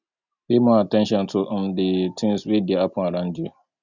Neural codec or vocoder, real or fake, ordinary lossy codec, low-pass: none; real; none; 7.2 kHz